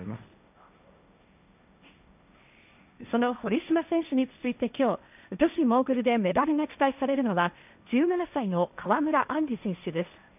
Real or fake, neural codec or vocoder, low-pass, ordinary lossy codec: fake; codec, 16 kHz, 1.1 kbps, Voila-Tokenizer; 3.6 kHz; none